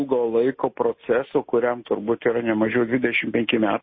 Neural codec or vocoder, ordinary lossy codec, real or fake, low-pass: none; MP3, 24 kbps; real; 7.2 kHz